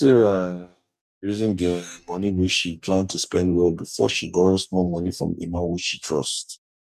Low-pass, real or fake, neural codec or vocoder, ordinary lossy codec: 14.4 kHz; fake; codec, 44.1 kHz, 2.6 kbps, DAC; none